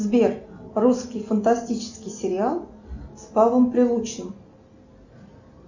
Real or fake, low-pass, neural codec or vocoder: real; 7.2 kHz; none